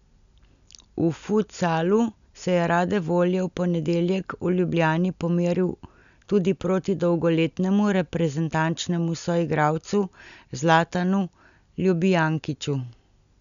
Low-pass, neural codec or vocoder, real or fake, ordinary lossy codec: 7.2 kHz; none; real; none